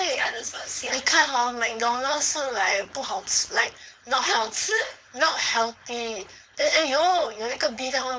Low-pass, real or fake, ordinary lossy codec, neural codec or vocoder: none; fake; none; codec, 16 kHz, 4.8 kbps, FACodec